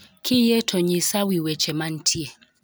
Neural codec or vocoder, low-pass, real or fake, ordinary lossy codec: none; none; real; none